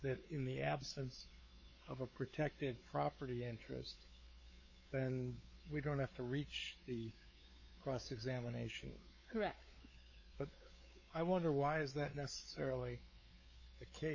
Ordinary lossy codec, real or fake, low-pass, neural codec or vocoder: MP3, 32 kbps; fake; 7.2 kHz; codec, 16 kHz, 4 kbps, X-Codec, WavLM features, trained on Multilingual LibriSpeech